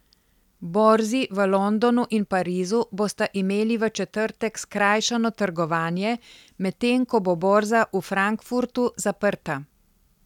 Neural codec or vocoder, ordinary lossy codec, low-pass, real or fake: none; none; 19.8 kHz; real